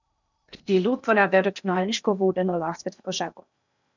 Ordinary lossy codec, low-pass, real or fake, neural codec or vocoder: none; 7.2 kHz; fake; codec, 16 kHz in and 24 kHz out, 0.8 kbps, FocalCodec, streaming, 65536 codes